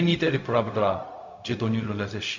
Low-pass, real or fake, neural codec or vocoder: 7.2 kHz; fake; codec, 16 kHz, 0.4 kbps, LongCat-Audio-Codec